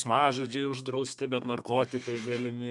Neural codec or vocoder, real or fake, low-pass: codec, 32 kHz, 1.9 kbps, SNAC; fake; 10.8 kHz